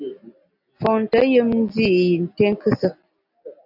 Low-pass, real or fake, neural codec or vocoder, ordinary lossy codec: 5.4 kHz; real; none; AAC, 48 kbps